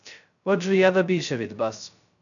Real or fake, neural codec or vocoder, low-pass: fake; codec, 16 kHz, 0.2 kbps, FocalCodec; 7.2 kHz